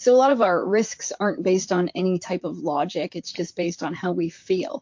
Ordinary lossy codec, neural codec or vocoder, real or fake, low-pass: MP3, 48 kbps; vocoder, 44.1 kHz, 128 mel bands, Pupu-Vocoder; fake; 7.2 kHz